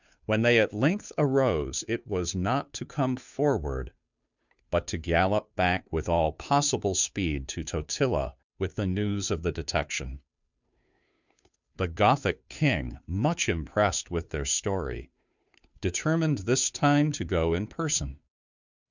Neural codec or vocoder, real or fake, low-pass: codec, 16 kHz, 2 kbps, FunCodec, trained on Chinese and English, 25 frames a second; fake; 7.2 kHz